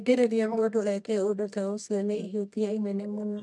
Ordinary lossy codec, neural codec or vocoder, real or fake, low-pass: none; codec, 24 kHz, 0.9 kbps, WavTokenizer, medium music audio release; fake; none